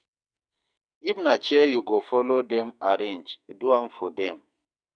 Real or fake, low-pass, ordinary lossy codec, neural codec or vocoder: fake; 9.9 kHz; none; codec, 44.1 kHz, 2.6 kbps, SNAC